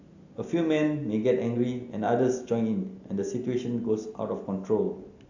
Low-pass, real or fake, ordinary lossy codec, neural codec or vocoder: 7.2 kHz; real; AAC, 48 kbps; none